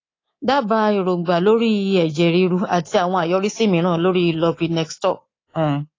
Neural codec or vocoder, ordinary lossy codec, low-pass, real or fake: codec, 24 kHz, 3.1 kbps, DualCodec; AAC, 32 kbps; 7.2 kHz; fake